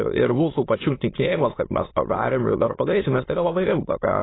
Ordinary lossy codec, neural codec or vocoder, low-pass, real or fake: AAC, 16 kbps; autoencoder, 22.05 kHz, a latent of 192 numbers a frame, VITS, trained on many speakers; 7.2 kHz; fake